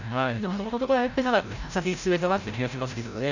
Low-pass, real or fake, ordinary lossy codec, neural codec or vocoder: 7.2 kHz; fake; none; codec, 16 kHz, 0.5 kbps, FreqCodec, larger model